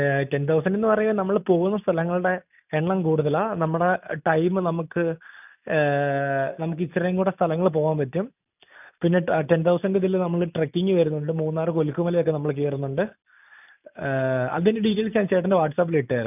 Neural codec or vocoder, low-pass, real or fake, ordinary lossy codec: none; 3.6 kHz; real; none